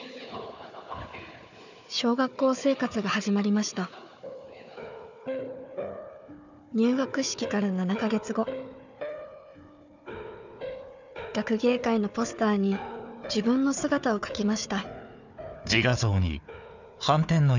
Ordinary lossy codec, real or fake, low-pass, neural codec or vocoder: none; fake; 7.2 kHz; codec, 16 kHz, 4 kbps, FunCodec, trained on Chinese and English, 50 frames a second